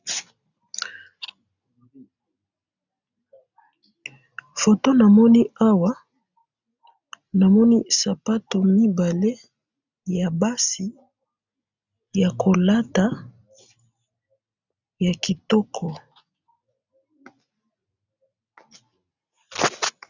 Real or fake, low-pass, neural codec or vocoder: real; 7.2 kHz; none